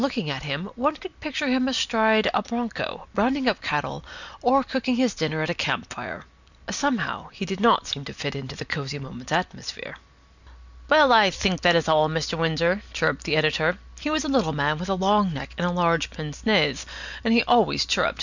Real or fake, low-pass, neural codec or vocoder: real; 7.2 kHz; none